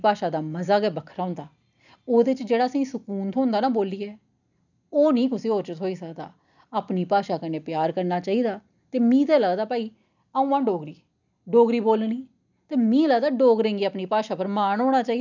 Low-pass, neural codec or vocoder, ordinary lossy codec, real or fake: 7.2 kHz; none; none; real